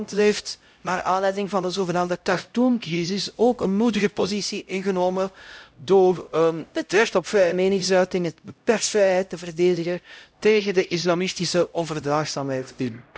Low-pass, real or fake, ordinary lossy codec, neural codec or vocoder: none; fake; none; codec, 16 kHz, 0.5 kbps, X-Codec, HuBERT features, trained on LibriSpeech